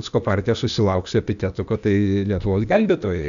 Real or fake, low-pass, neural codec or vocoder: fake; 7.2 kHz; codec, 16 kHz, 0.8 kbps, ZipCodec